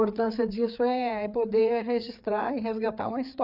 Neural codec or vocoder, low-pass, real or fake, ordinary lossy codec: codec, 16 kHz, 4 kbps, FreqCodec, larger model; 5.4 kHz; fake; none